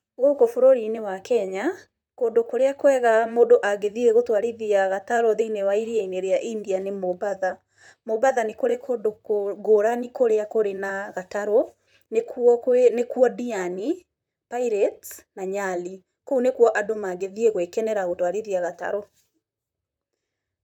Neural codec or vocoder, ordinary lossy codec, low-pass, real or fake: vocoder, 44.1 kHz, 128 mel bands, Pupu-Vocoder; none; 19.8 kHz; fake